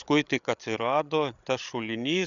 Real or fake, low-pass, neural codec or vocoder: fake; 7.2 kHz; codec, 16 kHz, 8 kbps, FreqCodec, larger model